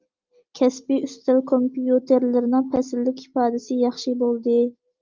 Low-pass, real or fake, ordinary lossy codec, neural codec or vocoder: 7.2 kHz; real; Opus, 24 kbps; none